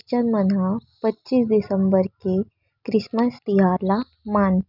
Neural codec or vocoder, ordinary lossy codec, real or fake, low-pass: none; none; real; 5.4 kHz